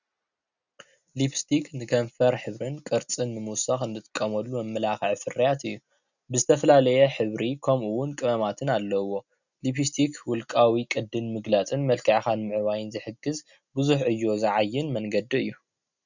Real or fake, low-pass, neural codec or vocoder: real; 7.2 kHz; none